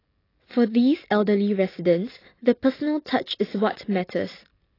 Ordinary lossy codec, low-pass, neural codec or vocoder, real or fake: AAC, 24 kbps; 5.4 kHz; none; real